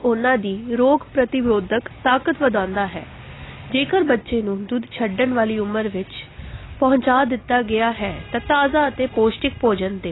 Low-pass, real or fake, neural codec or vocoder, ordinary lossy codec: 7.2 kHz; real; none; AAC, 16 kbps